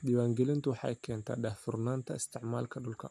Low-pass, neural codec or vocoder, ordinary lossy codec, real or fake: none; none; none; real